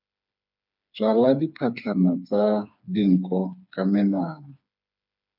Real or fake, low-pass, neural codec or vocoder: fake; 5.4 kHz; codec, 16 kHz, 4 kbps, FreqCodec, smaller model